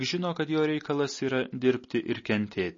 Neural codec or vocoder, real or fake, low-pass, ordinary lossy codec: none; real; 7.2 kHz; MP3, 32 kbps